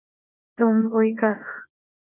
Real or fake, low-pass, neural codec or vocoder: fake; 3.6 kHz; codec, 24 kHz, 0.9 kbps, WavTokenizer, small release